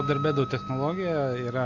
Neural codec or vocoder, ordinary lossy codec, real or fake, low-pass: none; AAC, 48 kbps; real; 7.2 kHz